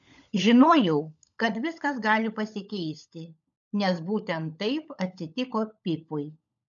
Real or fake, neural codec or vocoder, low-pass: fake; codec, 16 kHz, 16 kbps, FunCodec, trained on LibriTTS, 50 frames a second; 7.2 kHz